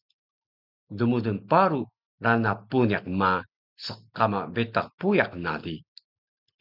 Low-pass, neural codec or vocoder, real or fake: 5.4 kHz; none; real